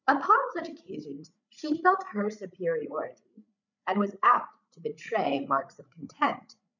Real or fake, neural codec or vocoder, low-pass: fake; codec, 16 kHz, 8 kbps, FreqCodec, larger model; 7.2 kHz